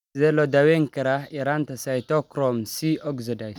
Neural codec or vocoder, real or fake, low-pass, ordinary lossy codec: none; real; 19.8 kHz; none